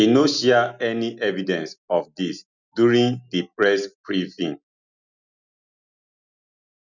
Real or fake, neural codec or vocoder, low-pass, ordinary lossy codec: real; none; 7.2 kHz; none